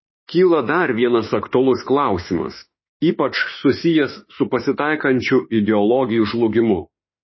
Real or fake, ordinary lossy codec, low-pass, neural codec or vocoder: fake; MP3, 24 kbps; 7.2 kHz; autoencoder, 48 kHz, 32 numbers a frame, DAC-VAE, trained on Japanese speech